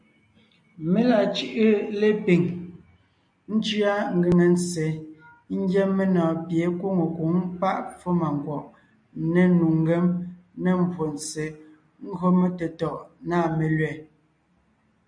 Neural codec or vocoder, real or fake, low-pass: none; real; 9.9 kHz